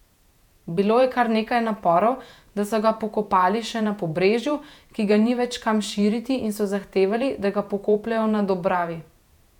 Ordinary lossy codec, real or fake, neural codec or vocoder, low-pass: none; fake; vocoder, 48 kHz, 128 mel bands, Vocos; 19.8 kHz